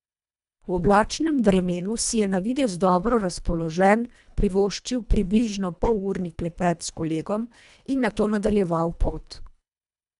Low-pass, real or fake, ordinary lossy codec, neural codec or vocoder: 10.8 kHz; fake; none; codec, 24 kHz, 1.5 kbps, HILCodec